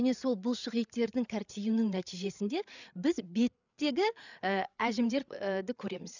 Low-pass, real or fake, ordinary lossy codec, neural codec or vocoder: 7.2 kHz; fake; none; codec, 16 kHz, 8 kbps, FreqCodec, larger model